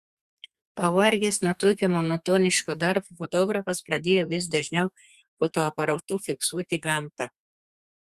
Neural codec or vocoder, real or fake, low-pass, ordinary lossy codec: codec, 32 kHz, 1.9 kbps, SNAC; fake; 14.4 kHz; Opus, 64 kbps